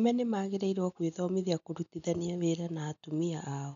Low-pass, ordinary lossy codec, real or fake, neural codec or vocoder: 7.2 kHz; none; real; none